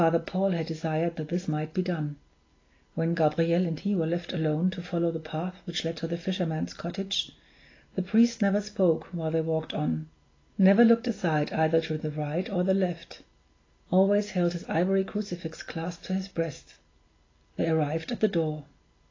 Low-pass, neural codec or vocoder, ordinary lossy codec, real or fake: 7.2 kHz; none; AAC, 32 kbps; real